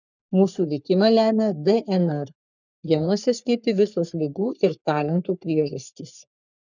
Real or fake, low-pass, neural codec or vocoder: fake; 7.2 kHz; codec, 44.1 kHz, 3.4 kbps, Pupu-Codec